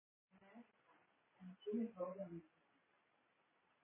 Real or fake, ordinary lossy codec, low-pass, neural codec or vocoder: real; MP3, 16 kbps; 3.6 kHz; none